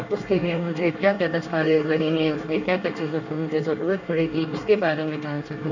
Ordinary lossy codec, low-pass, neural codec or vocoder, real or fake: none; 7.2 kHz; codec, 24 kHz, 1 kbps, SNAC; fake